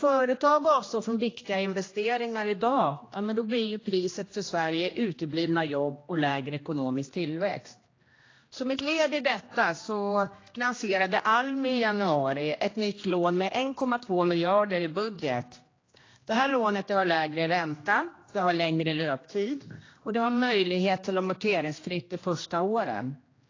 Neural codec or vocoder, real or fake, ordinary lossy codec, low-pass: codec, 16 kHz, 1 kbps, X-Codec, HuBERT features, trained on general audio; fake; AAC, 32 kbps; 7.2 kHz